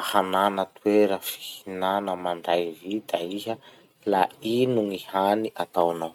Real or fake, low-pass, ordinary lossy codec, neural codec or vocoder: real; 19.8 kHz; none; none